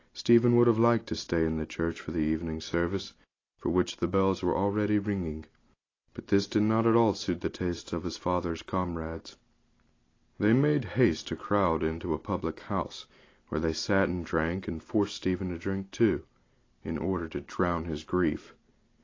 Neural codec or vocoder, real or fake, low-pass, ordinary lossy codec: none; real; 7.2 kHz; AAC, 32 kbps